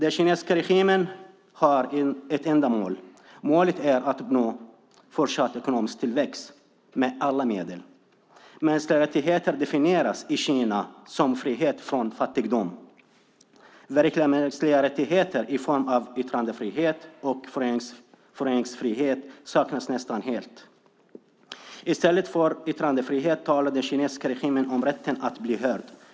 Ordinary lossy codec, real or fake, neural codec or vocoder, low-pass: none; real; none; none